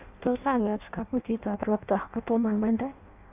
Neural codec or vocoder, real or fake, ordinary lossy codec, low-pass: codec, 16 kHz in and 24 kHz out, 0.6 kbps, FireRedTTS-2 codec; fake; none; 3.6 kHz